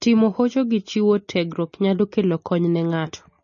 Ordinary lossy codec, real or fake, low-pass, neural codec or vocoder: MP3, 32 kbps; real; 7.2 kHz; none